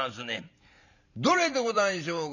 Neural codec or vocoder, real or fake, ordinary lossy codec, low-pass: vocoder, 44.1 kHz, 128 mel bands every 512 samples, BigVGAN v2; fake; none; 7.2 kHz